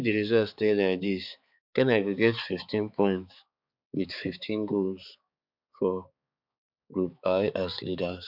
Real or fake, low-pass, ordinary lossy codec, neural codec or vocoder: fake; 5.4 kHz; MP3, 48 kbps; codec, 16 kHz, 4 kbps, X-Codec, HuBERT features, trained on balanced general audio